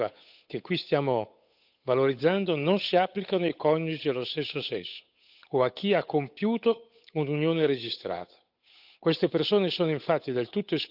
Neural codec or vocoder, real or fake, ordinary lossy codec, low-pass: codec, 16 kHz, 8 kbps, FunCodec, trained on Chinese and English, 25 frames a second; fake; none; 5.4 kHz